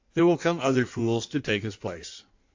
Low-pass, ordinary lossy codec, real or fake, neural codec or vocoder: 7.2 kHz; AAC, 48 kbps; fake; codec, 16 kHz in and 24 kHz out, 1.1 kbps, FireRedTTS-2 codec